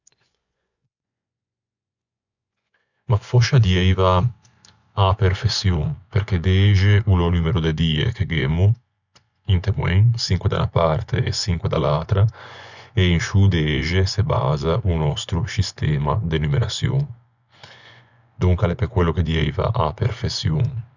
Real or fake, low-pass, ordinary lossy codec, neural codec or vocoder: fake; 7.2 kHz; none; vocoder, 24 kHz, 100 mel bands, Vocos